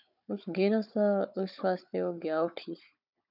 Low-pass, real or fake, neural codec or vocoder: 5.4 kHz; fake; codec, 16 kHz, 16 kbps, FunCodec, trained on Chinese and English, 50 frames a second